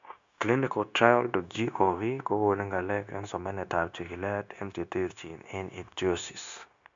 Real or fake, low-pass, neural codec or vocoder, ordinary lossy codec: fake; 7.2 kHz; codec, 16 kHz, 0.9 kbps, LongCat-Audio-Codec; MP3, 64 kbps